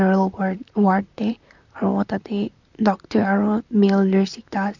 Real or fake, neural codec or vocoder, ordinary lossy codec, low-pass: fake; vocoder, 44.1 kHz, 128 mel bands, Pupu-Vocoder; none; 7.2 kHz